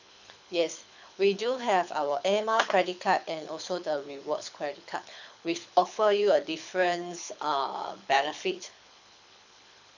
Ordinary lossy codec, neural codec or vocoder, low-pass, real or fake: none; codec, 24 kHz, 6 kbps, HILCodec; 7.2 kHz; fake